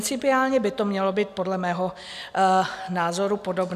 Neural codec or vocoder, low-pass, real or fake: none; 14.4 kHz; real